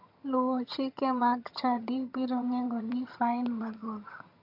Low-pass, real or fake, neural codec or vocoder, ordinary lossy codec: 5.4 kHz; fake; vocoder, 22.05 kHz, 80 mel bands, HiFi-GAN; AAC, 48 kbps